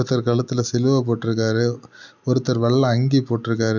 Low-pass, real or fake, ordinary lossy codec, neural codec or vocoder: 7.2 kHz; real; none; none